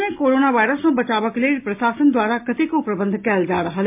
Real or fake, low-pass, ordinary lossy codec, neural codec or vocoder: real; 3.6 kHz; MP3, 32 kbps; none